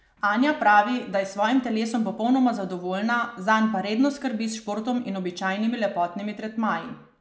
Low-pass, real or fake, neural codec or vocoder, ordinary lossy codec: none; real; none; none